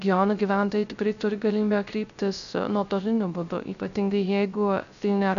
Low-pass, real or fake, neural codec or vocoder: 7.2 kHz; fake; codec, 16 kHz, 0.3 kbps, FocalCodec